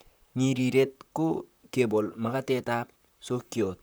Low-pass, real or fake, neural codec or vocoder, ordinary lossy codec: none; fake; vocoder, 44.1 kHz, 128 mel bands, Pupu-Vocoder; none